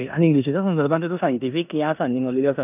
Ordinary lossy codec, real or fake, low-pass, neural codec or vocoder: none; fake; 3.6 kHz; codec, 16 kHz in and 24 kHz out, 0.9 kbps, LongCat-Audio-Codec, four codebook decoder